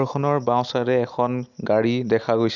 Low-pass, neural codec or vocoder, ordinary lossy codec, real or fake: 7.2 kHz; none; none; real